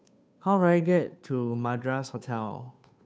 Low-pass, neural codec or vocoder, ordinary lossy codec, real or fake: none; codec, 16 kHz, 2 kbps, FunCodec, trained on Chinese and English, 25 frames a second; none; fake